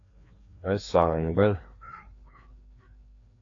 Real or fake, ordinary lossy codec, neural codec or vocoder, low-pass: fake; AAC, 32 kbps; codec, 16 kHz, 2 kbps, FreqCodec, larger model; 7.2 kHz